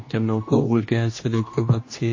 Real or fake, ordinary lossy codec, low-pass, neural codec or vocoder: fake; MP3, 32 kbps; 7.2 kHz; codec, 16 kHz, 1 kbps, X-Codec, HuBERT features, trained on general audio